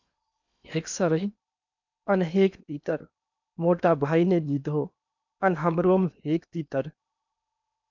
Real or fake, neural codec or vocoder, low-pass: fake; codec, 16 kHz in and 24 kHz out, 0.8 kbps, FocalCodec, streaming, 65536 codes; 7.2 kHz